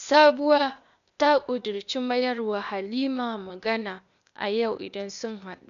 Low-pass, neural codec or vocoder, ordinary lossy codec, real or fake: 7.2 kHz; codec, 16 kHz, 0.8 kbps, ZipCodec; none; fake